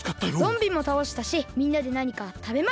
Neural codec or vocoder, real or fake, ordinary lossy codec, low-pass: none; real; none; none